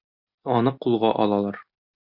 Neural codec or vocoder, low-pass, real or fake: none; 5.4 kHz; real